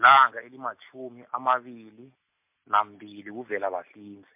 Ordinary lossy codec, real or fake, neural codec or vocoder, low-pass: MP3, 32 kbps; real; none; 3.6 kHz